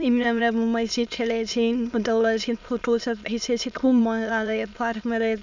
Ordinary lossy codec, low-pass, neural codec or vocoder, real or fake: none; 7.2 kHz; autoencoder, 22.05 kHz, a latent of 192 numbers a frame, VITS, trained on many speakers; fake